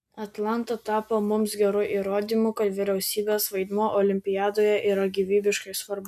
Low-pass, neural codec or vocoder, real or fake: 14.4 kHz; none; real